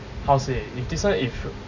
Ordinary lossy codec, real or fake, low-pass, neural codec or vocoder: none; real; 7.2 kHz; none